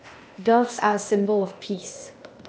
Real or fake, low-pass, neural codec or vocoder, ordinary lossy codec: fake; none; codec, 16 kHz, 0.8 kbps, ZipCodec; none